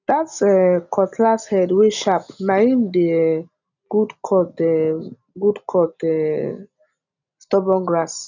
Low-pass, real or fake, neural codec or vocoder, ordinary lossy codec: 7.2 kHz; real; none; none